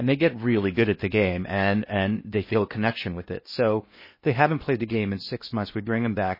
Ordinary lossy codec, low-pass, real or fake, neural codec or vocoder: MP3, 24 kbps; 5.4 kHz; fake; codec, 16 kHz in and 24 kHz out, 0.6 kbps, FocalCodec, streaming, 2048 codes